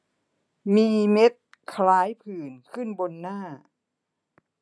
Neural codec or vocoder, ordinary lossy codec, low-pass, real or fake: none; none; none; real